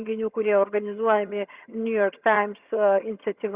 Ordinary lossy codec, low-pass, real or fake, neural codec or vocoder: Opus, 64 kbps; 3.6 kHz; fake; vocoder, 22.05 kHz, 80 mel bands, HiFi-GAN